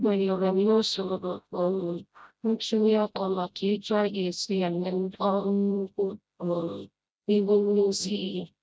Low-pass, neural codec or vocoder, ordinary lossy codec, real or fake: none; codec, 16 kHz, 0.5 kbps, FreqCodec, smaller model; none; fake